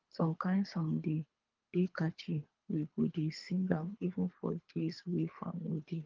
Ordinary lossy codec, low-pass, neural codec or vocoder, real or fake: Opus, 32 kbps; 7.2 kHz; codec, 24 kHz, 3 kbps, HILCodec; fake